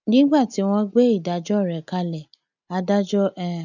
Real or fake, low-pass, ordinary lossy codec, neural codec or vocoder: real; 7.2 kHz; none; none